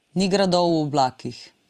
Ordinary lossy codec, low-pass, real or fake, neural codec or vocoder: Opus, 32 kbps; 14.4 kHz; fake; vocoder, 44.1 kHz, 128 mel bands every 256 samples, BigVGAN v2